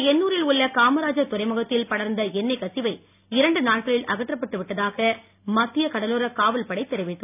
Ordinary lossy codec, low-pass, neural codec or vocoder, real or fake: none; 3.6 kHz; none; real